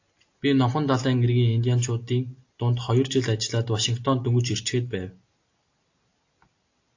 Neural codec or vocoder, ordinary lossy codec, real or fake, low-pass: none; AAC, 48 kbps; real; 7.2 kHz